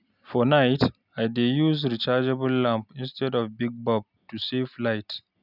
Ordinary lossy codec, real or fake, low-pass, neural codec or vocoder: none; real; 5.4 kHz; none